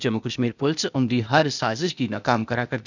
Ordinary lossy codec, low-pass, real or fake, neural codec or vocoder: none; 7.2 kHz; fake; codec, 16 kHz, 0.8 kbps, ZipCodec